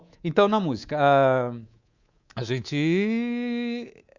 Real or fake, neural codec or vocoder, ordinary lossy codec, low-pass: fake; codec, 24 kHz, 3.1 kbps, DualCodec; Opus, 64 kbps; 7.2 kHz